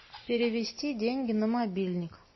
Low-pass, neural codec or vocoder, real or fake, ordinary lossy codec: 7.2 kHz; none; real; MP3, 24 kbps